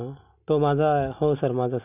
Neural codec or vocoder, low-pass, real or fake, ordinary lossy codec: none; 3.6 kHz; real; none